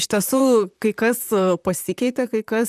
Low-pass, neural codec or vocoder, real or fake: 14.4 kHz; vocoder, 44.1 kHz, 128 mel bands, Pupu-Vocoder; fake